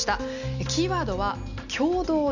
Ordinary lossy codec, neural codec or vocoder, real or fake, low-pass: none; none; real; 7.2 kHz